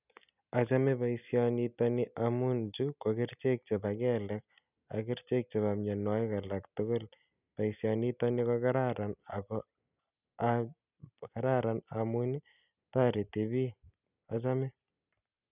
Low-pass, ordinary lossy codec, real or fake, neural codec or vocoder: 3.6 kHz; none; real; none